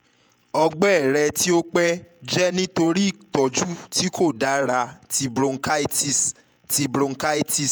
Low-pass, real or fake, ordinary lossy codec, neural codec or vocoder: none; fake; none; vocoder, 48 kHz, 128 mel bands, Vocos